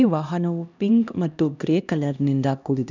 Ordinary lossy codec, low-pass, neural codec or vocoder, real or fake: none; 7.2 kHz; codec, 16 kHz, 1 kbps, X-Codec, HuBERT features, trained on LibriSpeech; fake